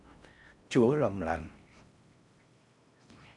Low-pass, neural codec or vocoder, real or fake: 10.8 kHz; codec, 16 kHz in and 24 kHz out, 0.6 kbps, FocalCodec, streaming, 4096 codes; fake